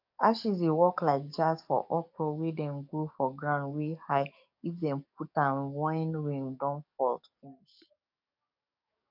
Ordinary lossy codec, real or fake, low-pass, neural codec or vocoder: MP3, 48 kbps; fake; 5.4 kHz; codec, 44.1 kHz, 7.8 kbps, DAC